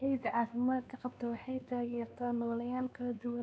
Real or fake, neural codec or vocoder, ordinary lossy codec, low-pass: fake; codec, 16 kHz, 2 kbps, X-Codec, WavLM features, trained on Multilingual LibriSpeech; none; none